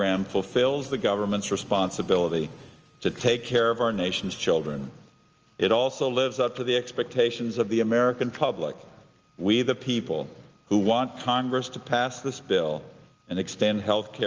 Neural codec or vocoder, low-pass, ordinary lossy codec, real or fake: none; 7.2 kHz; Opus, 24 kbps; real